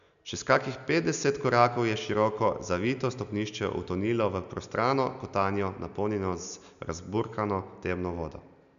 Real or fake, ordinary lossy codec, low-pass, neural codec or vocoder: real; none; 7.2 kHz; none